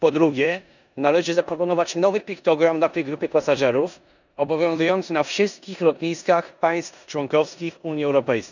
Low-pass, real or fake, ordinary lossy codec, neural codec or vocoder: 7.2 kHz; fake; none; codec, 16 kHz in and 24 kHz out, 0.9 kbps, LongCat-Audio-Codec, four codebook decoder